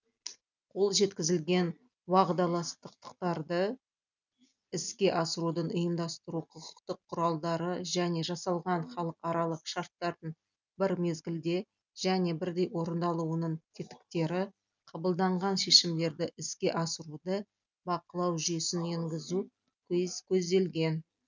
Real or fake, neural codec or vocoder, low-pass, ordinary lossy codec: real; none; 7.2 kHz; none